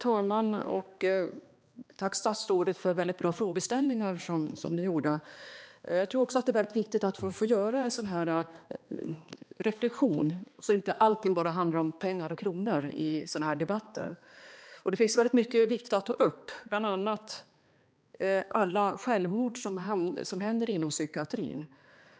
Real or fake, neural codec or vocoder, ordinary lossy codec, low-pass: fake; codec, 16 kHz, 2 kbps, X-Codec, HuBERT features, trained on balanced general audio; none; none